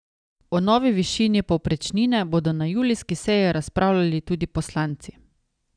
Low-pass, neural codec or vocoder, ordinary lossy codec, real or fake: 9.9 kHz; none; none; real